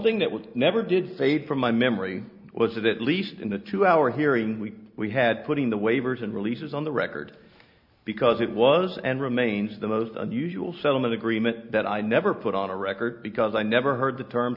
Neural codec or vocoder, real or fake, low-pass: none; real; 5.4 kHz